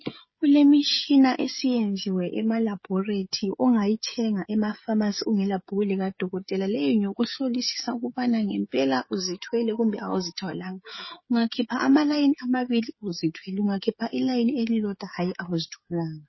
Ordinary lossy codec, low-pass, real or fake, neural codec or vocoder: MP3, 24 kbps; 7.2 kHz; fake; codec, 16 kHz, 16 kbps, FreqCodec, smaller model